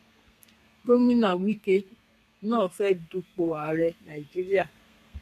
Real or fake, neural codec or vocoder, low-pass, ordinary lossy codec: fake; codec, 32 kHz, 1.9 kbps, SNAC; 14.4 kHz; none